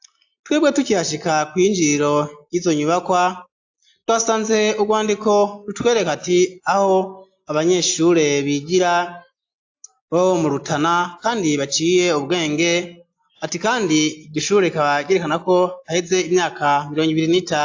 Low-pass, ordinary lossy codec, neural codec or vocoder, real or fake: 7.2 kHz; AAC, 48 kbps; none; real